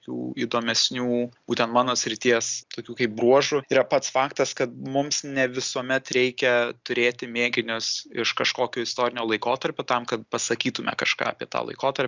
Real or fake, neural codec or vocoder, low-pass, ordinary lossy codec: real; none; 7.2 kHz; Opus, 64 kbps